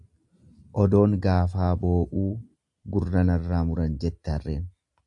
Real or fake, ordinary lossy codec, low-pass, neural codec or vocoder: real; AAC, 64 kbps; 10.8 kHz; none